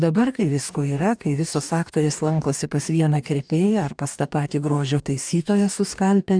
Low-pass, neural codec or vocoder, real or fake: 9.9 kHz; codec, 44.1 kHz, 2.6 kbps, DAC; fake